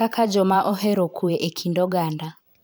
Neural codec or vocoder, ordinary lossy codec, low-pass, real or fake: vocoder, 44.1 kHz, 128 mel bands every 512 samples, BigVGAN v2; none; none; fake